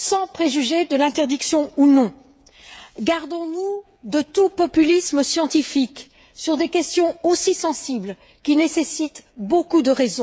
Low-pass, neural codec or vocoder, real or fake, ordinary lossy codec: none; codec, 16 kHz, 8 kbps, FreqCodec, smaller model; fake; none